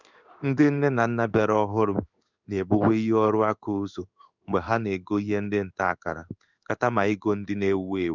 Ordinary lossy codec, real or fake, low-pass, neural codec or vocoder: none; fake; 7.2 kHz; codec, 16 kHz in and 24 kHz out, 1 kbps, XY-Tokenizer